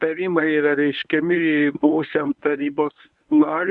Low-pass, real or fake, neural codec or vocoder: 10.8 kHz; fake; codec, 24 kHz, 0.9 kbps, WavTokenizer, medium speech release version 1